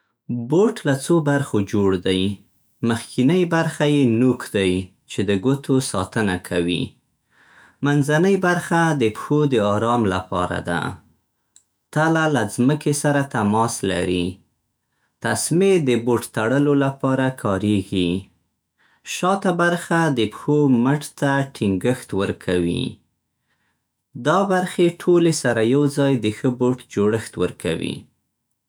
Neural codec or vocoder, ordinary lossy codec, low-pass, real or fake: autoencoder, 48 kHz, 128 numbers a frame, DAC-VAE, trained on Japanese speech; none; none; fake